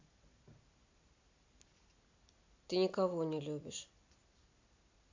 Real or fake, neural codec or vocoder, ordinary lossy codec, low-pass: real; none; MP3, 64 kbps; 7.2 kHz